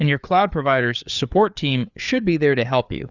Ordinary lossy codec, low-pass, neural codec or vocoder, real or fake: Opus, 64 kbps; 7.2 kHz; codec, 16 kHz, 4 kbps, FreqCodec, larger model; fake